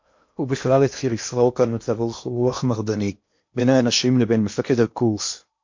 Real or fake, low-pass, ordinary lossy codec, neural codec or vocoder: fake; 7.2 kHz; MP3, 48 kbps; codec, 16 kHz in and 24 kHz out, 0.8 kbps, FocalCodec, streaming, 65536 codes